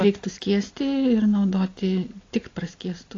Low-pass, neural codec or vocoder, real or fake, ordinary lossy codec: 7.2 kHz; none; real; AAC, 32 kbps